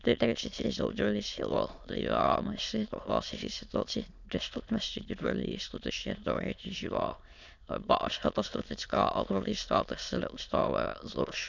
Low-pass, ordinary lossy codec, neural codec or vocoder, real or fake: 7.2 kHz; none; autoencoder, 22.05 kHz, a latent of 192 numbers a frame, VITS, trained on many speakers; fake